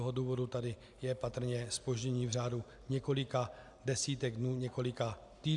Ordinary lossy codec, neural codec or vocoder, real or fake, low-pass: MP3, 96 kbps; none; real; 10.8 kHz